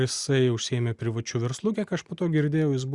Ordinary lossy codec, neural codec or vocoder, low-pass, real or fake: Opus, 64 kbps; none; 10.8 kHz; real